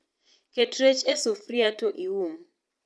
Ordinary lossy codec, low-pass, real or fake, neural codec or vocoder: none; none; fake; vocoder, 22.05 kHz, 80 mel bands, Vocos